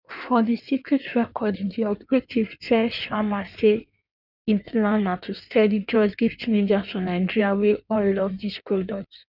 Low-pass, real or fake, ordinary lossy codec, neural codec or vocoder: 5.4 kHz; fake; none; codec, 16 kHz in and 24 kHz out, 1.1 kbps, FireRedTTS-2 codec